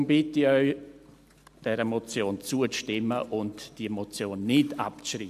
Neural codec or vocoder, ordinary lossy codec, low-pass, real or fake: none; AAC, 96 kbps; 14.4 kHz; real